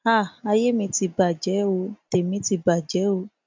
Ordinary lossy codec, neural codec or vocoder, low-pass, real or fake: MP3, 64 kbps; none; 7.2 kHz; real